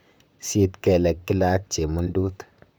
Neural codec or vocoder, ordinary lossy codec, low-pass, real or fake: vocoder, 44.1 kHz, 128 mel bands, Pupu-Vocoder; none; none; fake